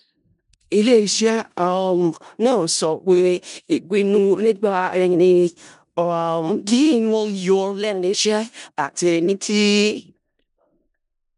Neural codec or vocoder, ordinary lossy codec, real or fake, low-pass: codec, 16 kHz in and 24 kHz out, 0.4 kbps, LongCat-Audio-Codec, four codebook decoder; none; fake; 10.8 kHz